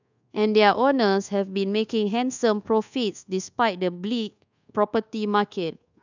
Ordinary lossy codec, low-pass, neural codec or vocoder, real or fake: none; 7.2 kHz; codec, 24 kHz, 1.2 kbps, DualCodec; fake